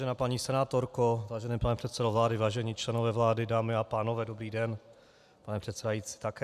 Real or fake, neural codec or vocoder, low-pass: real; none; 14.4 kHz